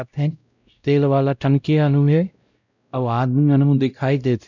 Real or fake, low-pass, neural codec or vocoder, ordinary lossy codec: fake; 7.2 kHz; codec, 16 kHz, 0.5 kbps, X-Codec, WavLM features, trained on Multilingual LibriSpeech; none